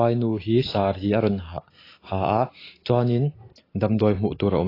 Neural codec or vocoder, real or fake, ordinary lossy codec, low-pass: none; real; AAC, 24 kbps; 5.4 kHz